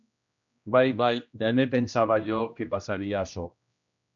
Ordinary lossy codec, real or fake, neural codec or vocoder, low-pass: MP3, 96 kbps; fake; codec, 16 kHz, 1 kbps, X-Codec, HuBERT features, trained on general audio; 7.2 kHz